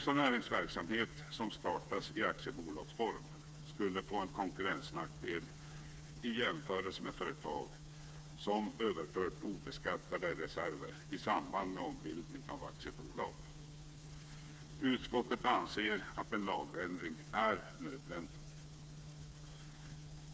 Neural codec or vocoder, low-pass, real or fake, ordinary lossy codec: codec, 16 kHz, 4 kbps, FreqCodec, smaller model; none; fake; none